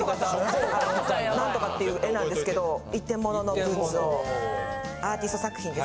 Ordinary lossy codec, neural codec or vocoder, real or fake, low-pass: none; none; real; none